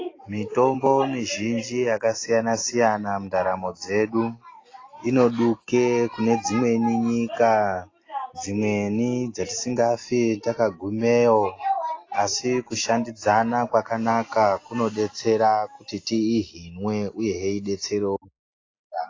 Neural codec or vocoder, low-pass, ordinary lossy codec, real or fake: none; 7.2 kHz; AAC, 32 kbps; real